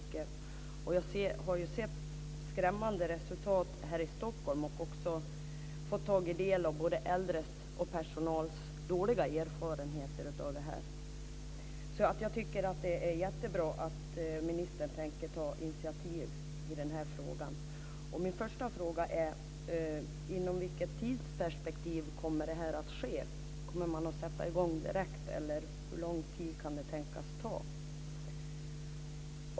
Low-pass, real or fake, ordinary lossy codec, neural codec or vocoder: none; real; none; none